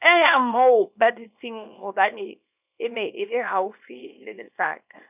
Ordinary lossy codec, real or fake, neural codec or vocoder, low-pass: none; fake; codec, 24 kHz, 0.9 kbps, WavTokenizer, small release; 3.6 kHz